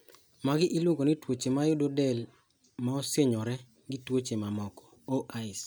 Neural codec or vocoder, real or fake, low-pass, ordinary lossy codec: none; real; none; none